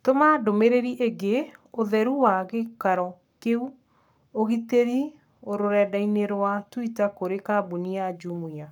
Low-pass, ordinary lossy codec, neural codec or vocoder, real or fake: 19.8 kHz; none; codec, 44.1 kHz, 7.8 kbps, Pupu-Codec; fake